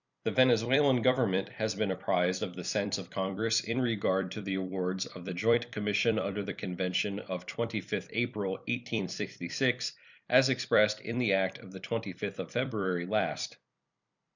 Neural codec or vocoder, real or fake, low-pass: vocoder, 44.1 kHz, 128 mel bands every 256 samples, BigVGAN v2; fake; 7.2 kHz